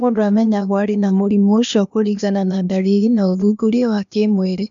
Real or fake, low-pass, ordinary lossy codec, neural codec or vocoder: fake; 7.2 kHz; none; codec, 16 kHz, 0.8 kbps, ZipCodec